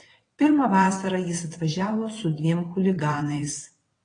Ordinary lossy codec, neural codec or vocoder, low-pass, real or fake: AAC, 32 kbps; vocoder, 22.05 kHz, 80 mel bands, WaveNeXt; 9.9 kHz; fake